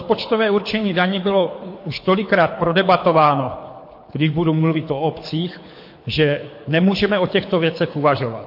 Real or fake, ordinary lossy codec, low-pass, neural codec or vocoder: fake; MP3, 32 kbps; 5.4 kHz; codec, 24 kHz, 6 kbps, HILCodec